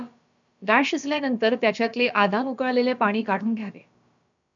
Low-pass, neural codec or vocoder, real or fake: 7.2 kHz; codec, 16 kHz, about 1 kbps, DyCAST, with the encoder's durations; fake